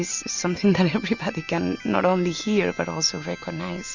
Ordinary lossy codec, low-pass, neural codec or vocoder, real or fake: Opus, 64 kbps; 7.2 kHz; none; real